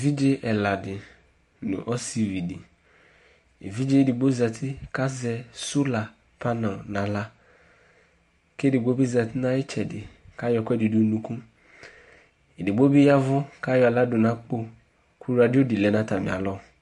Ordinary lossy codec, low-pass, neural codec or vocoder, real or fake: MP3, 48 kbps; 14.4 kHz; none; real